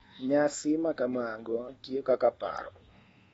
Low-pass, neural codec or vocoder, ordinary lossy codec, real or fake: 10.8 kHz; codec, 24 kHz, 1.2 kbps, DualCodec; AAC, 24 kbps; fake